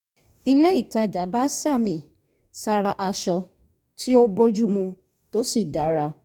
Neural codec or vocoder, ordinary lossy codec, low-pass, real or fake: codec, 44.1 kHz, 2.6 kbps, DAC; none; 19.8 kHz; fake